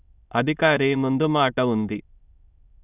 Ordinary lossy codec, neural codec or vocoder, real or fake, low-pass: none; autoencoder, 22.05 kHz, a latent of 192 numbers a frame, VITS, trained on many speakers; fake; 3.6 kHz